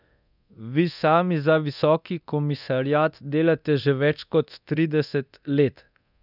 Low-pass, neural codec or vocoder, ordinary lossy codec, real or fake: 5.4 kHz; codec, 24 kHz, 0.9 kbps, DualCodec; none; fake